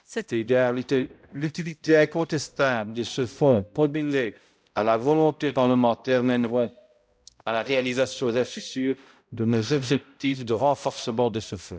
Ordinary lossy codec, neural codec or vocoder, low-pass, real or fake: none; codec, 16 kHz, 0.5 kbps, X-Codec, HuBERT features, trained on balanced general audio; none; fake